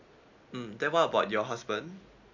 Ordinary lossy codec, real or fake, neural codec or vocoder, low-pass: MP3, 64 kbps; real; none; 7.2 kHz